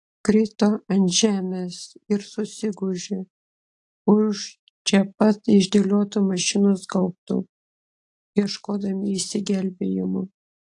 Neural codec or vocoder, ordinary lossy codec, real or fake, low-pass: none; AAC, 48 kbps; real; 10.8 kHz